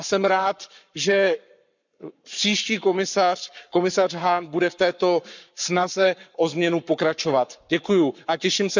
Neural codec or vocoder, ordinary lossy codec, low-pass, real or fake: codec, 44.1 kHz, 7.8 kbps, Pupu-Codec; none; 7.2 kHz; fake